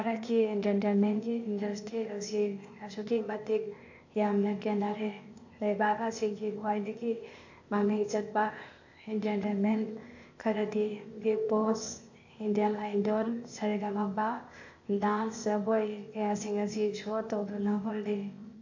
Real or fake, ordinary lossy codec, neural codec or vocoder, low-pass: fake; AAC, 48 kbps; codec, 16 kHz, 0.8 kbps, ZipCodec; 7.2 kHz